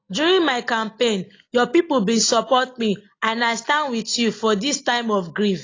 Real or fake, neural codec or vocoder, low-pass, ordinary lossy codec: real; none; 7.2 kHz; AAC, 32 kbps